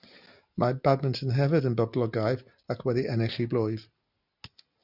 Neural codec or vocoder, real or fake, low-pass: none; real; 5.4 kHz